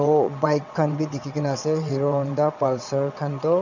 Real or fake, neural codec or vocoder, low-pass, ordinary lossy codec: fake; vocoder, 22.05 kHz, 80 mel bands, WaveNeXt; 7.2 kHz; none